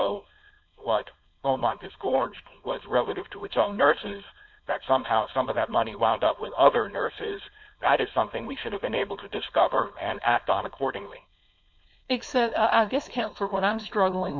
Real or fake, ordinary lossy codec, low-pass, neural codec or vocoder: fake; MP3, 48 kbps; 7.2 kHz; codec, 16 kHz, 4.8 kbps, FACodec